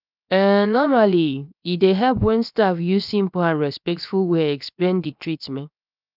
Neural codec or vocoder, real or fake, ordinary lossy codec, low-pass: codec, 16 kHz, 0.7 kbps, FocalCodec; fake; none; 5.4 kHz